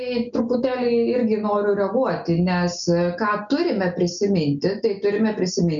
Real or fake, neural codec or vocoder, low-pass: real; none; 7.2 kHz